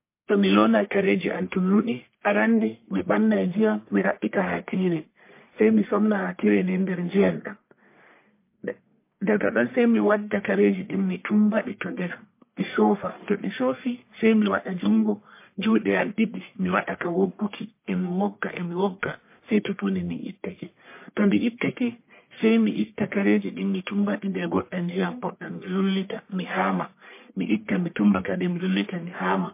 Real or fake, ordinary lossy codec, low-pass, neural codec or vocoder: fake; MP3, 24 kbps; 3.6 kHz; codec, 44.1 kHz, 1.7 kbps, Pupu-Codec